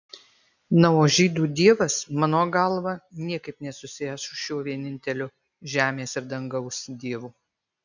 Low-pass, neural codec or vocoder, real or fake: 7.2 kHz; none; real